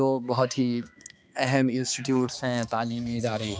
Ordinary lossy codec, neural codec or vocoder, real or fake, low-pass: none; codec, 16 kHz, 2 kbps, X-Codec, HuBERT features, trained on balanced general audio; fake; none